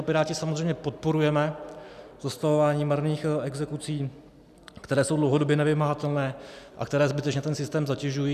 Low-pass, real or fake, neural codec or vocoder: 14.4 kHz; real; none